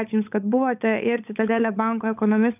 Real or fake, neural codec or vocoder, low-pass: fake; codec, 16 kHz, 16 kbps, FunCodec, trained on LibriTTS, 50 frames a second; 3.6 kHz